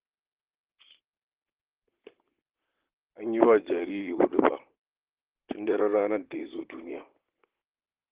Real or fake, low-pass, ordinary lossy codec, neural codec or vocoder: fake; 3.6 kHz; Opus, 16 kbps; vocoder, 44.1 kHz, 128 mel bands, Pupu-Vocoder